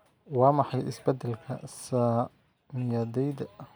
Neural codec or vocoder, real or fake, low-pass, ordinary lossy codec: none; real; none; none